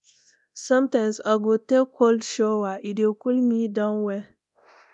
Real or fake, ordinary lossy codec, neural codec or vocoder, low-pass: fake; none; codec, 24 kHz, 0.9 kbps, DualCodec; 10.8 kHz